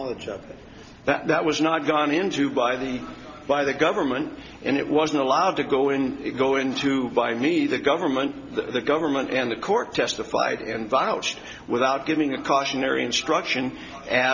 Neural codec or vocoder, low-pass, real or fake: none; 7.2 kHz; real